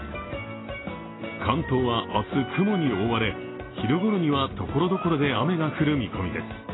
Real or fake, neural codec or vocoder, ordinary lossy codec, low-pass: real; none; AAC, 16 kbps; 7.2 kHz